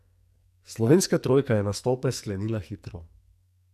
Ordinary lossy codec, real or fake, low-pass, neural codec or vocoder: none; fake; 14.4 kHz; codec, 44.1 kHz, 2.6 kbps, SNAC